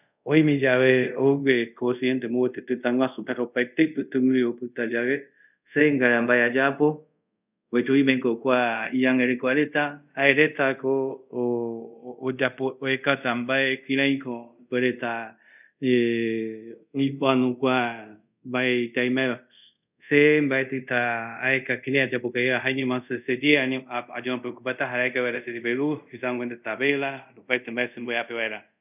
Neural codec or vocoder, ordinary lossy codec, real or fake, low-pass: codec, 24 kHz, 0.5 kbps, DualCodec; none; fake; 3.6 kHz